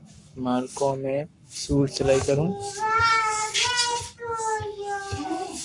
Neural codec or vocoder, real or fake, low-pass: codec, 44.1 kHz, 7.8 kbps, Pupu-Codec; fake; 10.8 kHz